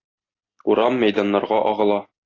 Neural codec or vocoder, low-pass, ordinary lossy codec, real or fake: none; 7.2 kHz; AAC, 32 kbps; real